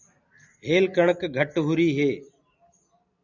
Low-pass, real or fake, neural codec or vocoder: 7.2 kHz; real; none